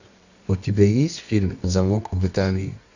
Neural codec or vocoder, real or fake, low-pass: codec, 24 kHz, 0.9 kbps, WavTokenizer, medium music audio release; fake; 7.2 kHz